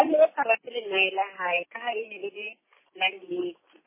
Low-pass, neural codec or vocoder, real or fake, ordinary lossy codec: 3.6 kHz; none; real; MP3, 16 kbps